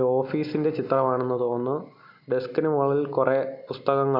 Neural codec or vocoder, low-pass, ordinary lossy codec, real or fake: none; 5.4 kHz; none; real